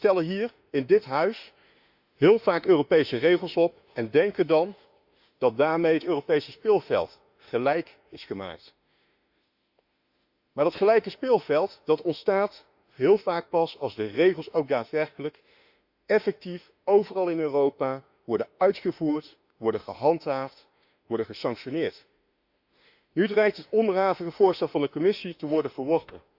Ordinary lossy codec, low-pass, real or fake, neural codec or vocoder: Opus, 64 kbps; 5.4 kHz; fake; autoencoder, 48 kHz, 32 numbers a frame, DAC-VAE, trained on Japanese speech